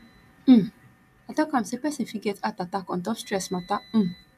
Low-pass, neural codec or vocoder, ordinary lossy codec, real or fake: 14.4 kHz; none; AAC, 96 kbps; real